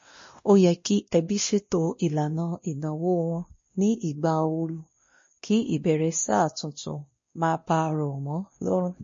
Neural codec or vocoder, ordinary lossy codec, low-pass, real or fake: codec, 16 kHz, 1 kbps, X-Codec, WavLM features, trained on Multilingual LibriSpeech; MP3, 32 kbps; 7.2 kHz; fake